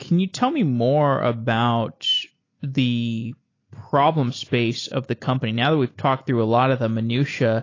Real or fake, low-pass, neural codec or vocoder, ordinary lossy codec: real; 7.2 kHz; none; AAC, 32 kbps